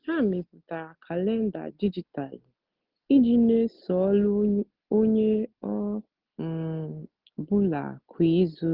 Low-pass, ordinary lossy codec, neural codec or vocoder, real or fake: 5.4 kHz; Opus, 24 kbps; none; real